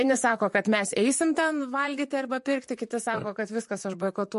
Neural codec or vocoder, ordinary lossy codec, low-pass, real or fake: vocoder, 44.1 kHz, 128 mel bands, Pupu-Vocoder; MP3, 48 kbps; 14.4 kHz; fake